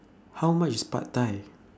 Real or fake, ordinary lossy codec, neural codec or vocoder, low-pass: real; none; none; none